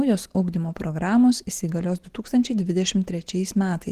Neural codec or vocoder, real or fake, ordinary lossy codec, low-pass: none; real; Opus, 16 kbps; 14.4 kHz